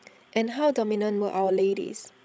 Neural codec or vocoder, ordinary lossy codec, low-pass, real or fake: codec, 16 kHz, 16 kbps, FreqCodec, larger model; none; none; fake